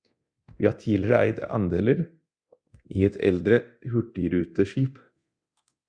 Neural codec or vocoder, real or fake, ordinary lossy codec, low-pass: codec, 24 kHz, 0.9 kbps, DualCodec; fake; Opus, 64 kbps; 9.9 kHz